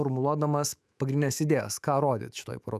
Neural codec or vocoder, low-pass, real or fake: autoencoder, 48 kHz, 128 numbers a frame, DAC-VAE, trained on Japanese speech; 14.4 kHz; fake